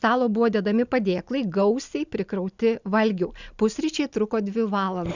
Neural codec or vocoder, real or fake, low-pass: none; real; 7.2 kHz